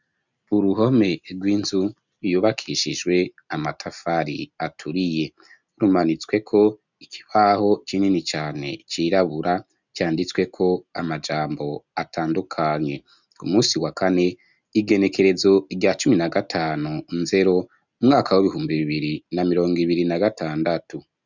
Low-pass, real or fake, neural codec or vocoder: 7.2 kHz; real; none